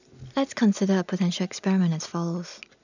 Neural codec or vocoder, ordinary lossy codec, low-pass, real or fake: none; none; 7.2 kHz; real